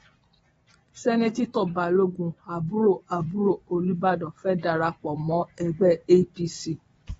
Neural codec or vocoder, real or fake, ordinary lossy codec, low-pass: vocoder, 24 kHz, 100 mel bands, Vocos; fake; AAC, 24 kbps; 10.8 kHz